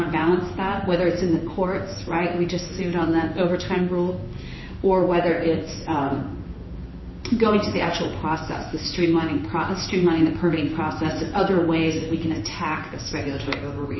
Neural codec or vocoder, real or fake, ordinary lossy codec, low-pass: codec, 16 kHz in and 24 kHz out, 1 kbps, XY-Tokenizer; fake; MP3, 24 kbps; 7.2 kHz